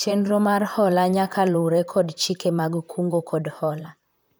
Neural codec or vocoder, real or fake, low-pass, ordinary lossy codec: vocoder, 44.1 kHz, 128 mel bands, Pupu-Vocoder; fake; none; none